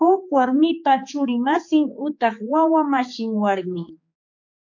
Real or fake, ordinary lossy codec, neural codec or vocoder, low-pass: fake; MP3, 48 kbps; codec, 16 kHz, 4 kbps, X-Codec, HuBERT features, trained on general audio; 7.2 kHz